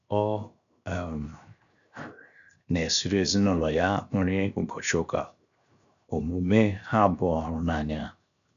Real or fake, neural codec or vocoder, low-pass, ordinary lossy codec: fake; codec, 16 kHz, 0.7 kbps, FocalCodec; 7.2 kHz; none